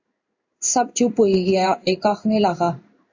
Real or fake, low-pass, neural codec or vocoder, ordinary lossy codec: fake; 7.2 kHz; codec, 16 kHz in and 24 kHz out, 1 kbps, XY-Tokenizer; MP3, 64 kbps